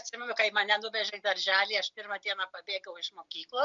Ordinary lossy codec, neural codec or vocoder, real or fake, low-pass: MP3, 64 kbps; none; real; 7.2 kHz